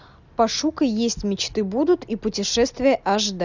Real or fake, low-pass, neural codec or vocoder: real; 7.2 kHz; none